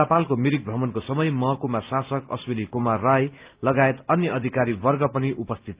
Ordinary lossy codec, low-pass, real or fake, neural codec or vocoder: Opus, 32 kbps; 3.6 kHz; real; none